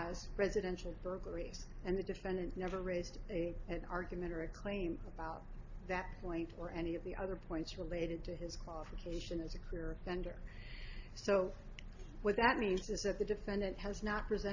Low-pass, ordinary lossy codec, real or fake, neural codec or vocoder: 7.2 kHz; AAC, 48 kbps; real; none